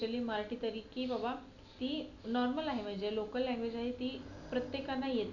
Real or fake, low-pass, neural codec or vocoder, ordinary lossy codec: real; 7.2 kHz; none; none